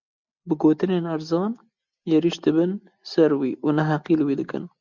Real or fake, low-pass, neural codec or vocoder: real; 7.2 kHz; none